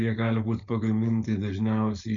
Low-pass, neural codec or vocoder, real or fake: 7.2 kHz; codec, 16 kHz, 4.8 kbps, FACodec; fake